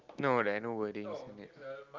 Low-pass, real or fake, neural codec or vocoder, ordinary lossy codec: 7.2 kHz; real; none; Opus, 32 kbps